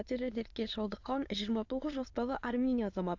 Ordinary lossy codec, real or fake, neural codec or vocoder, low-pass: AAC, 48 kbps; fake; autoencoder, 22.05 kHz, a latent of 192 numbers a frame, VITS, trained on many speakers; 7.2 kHz